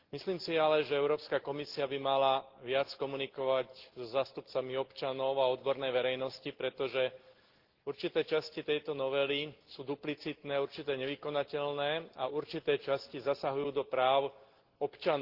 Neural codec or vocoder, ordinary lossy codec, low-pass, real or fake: none; Opus, 16 kbps; 5.4 kHz; real